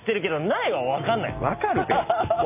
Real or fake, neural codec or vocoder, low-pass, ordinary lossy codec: real; none; 3.6 kHz; none